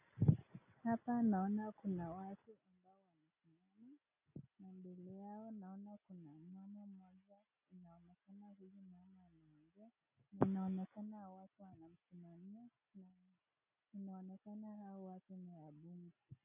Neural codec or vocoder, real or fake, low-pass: none; real; 3.6 kHz